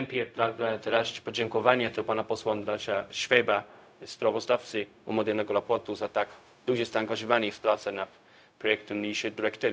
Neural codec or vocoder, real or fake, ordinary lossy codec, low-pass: codec, 16 kHz, 0.4 kbps, LongCat-Audio-Codec; fake; none; none